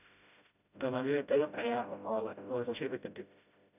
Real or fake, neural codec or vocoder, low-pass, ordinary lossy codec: fake; codec, 16 kHz, 0.5 kbps, FreqCodec, smaller model; 3.6 kHz; none